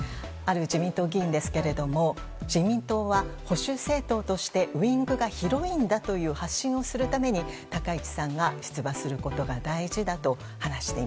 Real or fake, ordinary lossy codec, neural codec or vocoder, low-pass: real; none; none; none